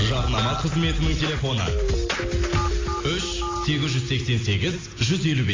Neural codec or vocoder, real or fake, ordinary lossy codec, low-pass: none; real; AAC, 32 kbps; 7.2 kHz